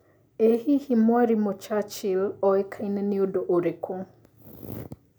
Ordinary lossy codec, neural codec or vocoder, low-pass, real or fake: none; none; none; real